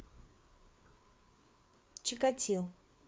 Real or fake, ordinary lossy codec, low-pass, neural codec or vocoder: fake; none; none; codec, 16 kHz, 4 kbps, FreqCodec, larger model